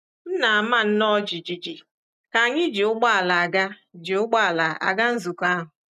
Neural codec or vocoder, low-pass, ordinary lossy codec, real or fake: none; 14.4 kHz; none; real